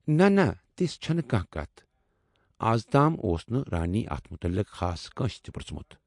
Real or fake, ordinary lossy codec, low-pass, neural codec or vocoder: real; MP3, 48 kbps; 10.8 kHz; none